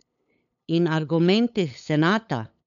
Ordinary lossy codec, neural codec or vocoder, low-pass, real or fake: none; codec, 16 kHz, 8 kbps, FunCodec, trained on LibriTTS, 25 frames a second; 7.2 kHz; fake